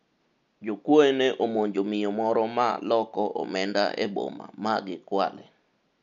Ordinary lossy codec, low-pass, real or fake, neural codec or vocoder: none; 7.2 kHz; real; none